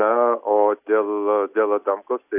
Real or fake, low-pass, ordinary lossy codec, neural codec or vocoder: real; 3.6 kHz; AAC, 32 kbps; none